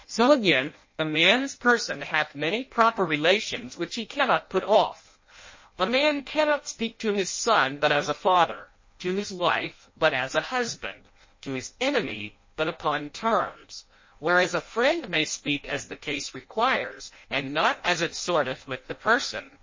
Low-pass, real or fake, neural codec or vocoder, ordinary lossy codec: 7.2 kHz; fake; codec, 16 kHz in and 24 kHz out, 0.6 kbps, FireRedTTS-2 codec; MP3, 32 kbps